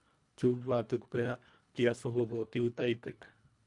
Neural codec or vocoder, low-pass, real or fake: codec, 24 kHz, 1.5 kbps, HILCodec; 10.8 kHz; fake